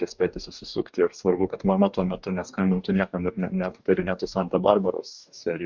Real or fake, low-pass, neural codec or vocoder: fake; 7.2 kHz; codec, 44.1 kHz, 2.6 kbps, DAC